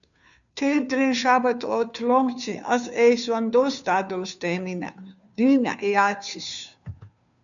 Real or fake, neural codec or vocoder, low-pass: fake; codec, 16 kHz, 2 kbps, FunCodec, trained on Chinese and English, 25 frames a second; 7.2 kHz